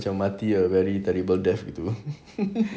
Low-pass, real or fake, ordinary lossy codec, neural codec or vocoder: none; real; none; none